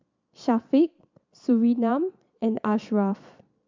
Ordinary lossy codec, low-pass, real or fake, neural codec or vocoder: MP3, 64 kbps; 7.2 kHz; fake; vocoder, 22.05 kHz, 80 mel bands, Vocos